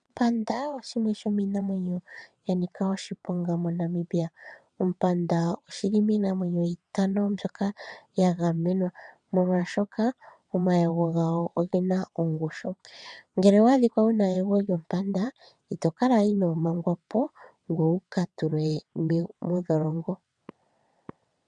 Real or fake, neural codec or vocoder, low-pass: fake; vocoder, 22.05 kHz, 80 mel bands, WaveNeXt; 9.9 kHz